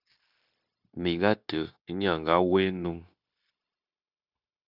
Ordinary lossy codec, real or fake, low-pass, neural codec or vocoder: Opus, 64 kbps; fake; 5.4 kHz; codec, 16 kHz, 0.9 kbps, LongCat-Audio-Codec